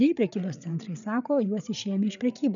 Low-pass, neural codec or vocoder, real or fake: 7.2 kHz; codec, 16 kHz, 8 kbps, FreqCodec, larger model; fake